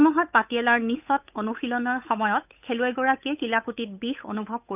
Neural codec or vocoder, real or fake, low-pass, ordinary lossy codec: codec, 16 kHz, 8 kbps, FunCodec, trained on Chinese and English, 25 frames a second; fake; 3.6 kHz; none